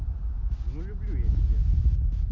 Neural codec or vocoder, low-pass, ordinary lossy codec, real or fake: none; 7.2 kHz; MP3, 32 kbps; real